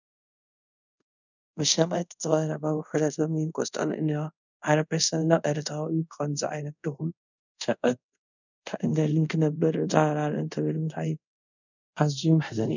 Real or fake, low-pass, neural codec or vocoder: fake; 7.2 kHz; codec, 24 kHz, 0.5 kbps, DualCodec